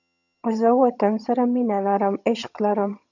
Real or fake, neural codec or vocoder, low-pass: fake; vocoder, 22.05 kHz, 80 mel bands, HiFi-GAN; 7.2 kHz